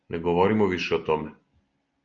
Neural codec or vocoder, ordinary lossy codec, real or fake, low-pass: none; Opus, 24 kbps; real; 7.2 kHz